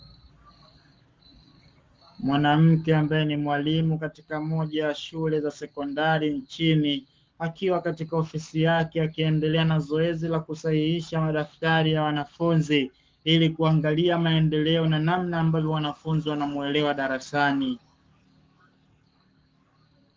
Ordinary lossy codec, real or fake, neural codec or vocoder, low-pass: Opus, 32 kbps; fake; codec, 44.1 kHz, 7.8 kbps, Pupu-Codec; 7.2 kHz